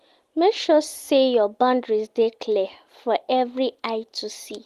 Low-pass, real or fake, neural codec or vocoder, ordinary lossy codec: 14.4 kHz; real; none; Opus, 24 kbps